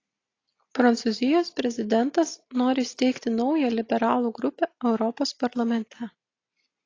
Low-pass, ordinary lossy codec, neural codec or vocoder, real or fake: 7.2 kHz; AAC, 32 kbps; none; real